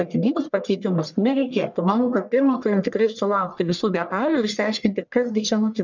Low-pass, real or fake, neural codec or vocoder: 7.2 kHz; fake; codec, 44.1 kHz, 1.7 kbps, Pupu-Codec